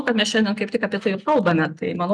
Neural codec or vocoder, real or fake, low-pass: none; real; 9.9 kHz